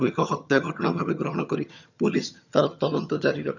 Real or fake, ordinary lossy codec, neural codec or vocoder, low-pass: fake; none; vocoder, 22.05 kHz, 80 mel bands, HiFi-GAN; 7.2 kHz